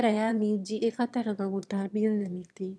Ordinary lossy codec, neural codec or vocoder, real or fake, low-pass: none; autoencoder, 22.05 kHz, a latent of 192 numbers a frame, VITS, trained on one speaker; fake; none